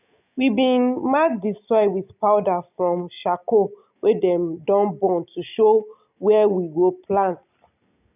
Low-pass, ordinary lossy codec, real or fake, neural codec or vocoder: 3.6 kHz; none; real; none